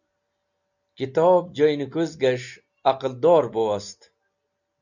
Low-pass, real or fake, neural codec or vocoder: 7.2 kHz; real; none